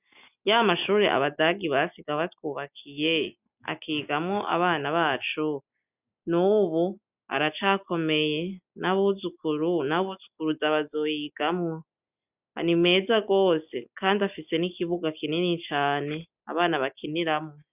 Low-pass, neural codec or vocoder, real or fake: 3.6 kHz; none; real